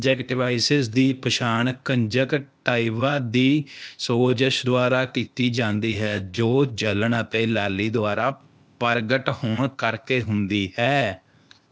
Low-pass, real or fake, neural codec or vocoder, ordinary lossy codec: none; fake; codec, 16 kHz, 0.8 kbps, ZipCodec; none